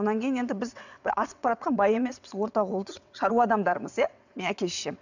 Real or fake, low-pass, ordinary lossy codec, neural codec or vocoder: fake; 7.2 kHz; none; vocoder, 22.05 kHz, 80 mel bands, WaveNeXt